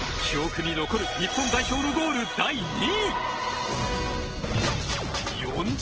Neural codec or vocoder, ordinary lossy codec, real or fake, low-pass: none; Opus, 16 kbps; real; 7.2 kHz